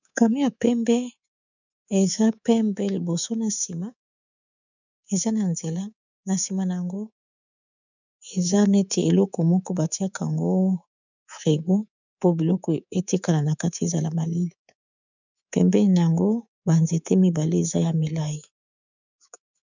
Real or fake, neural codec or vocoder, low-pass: fake; codec, 24 kHz, 3.1 kbps, DualCodec; 7.2 kHz